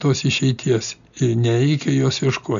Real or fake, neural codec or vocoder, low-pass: real; none; 7.2 kHz